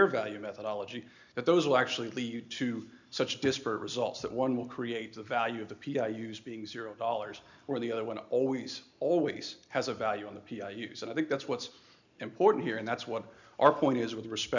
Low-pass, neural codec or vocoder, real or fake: 7.2 kHz; none; real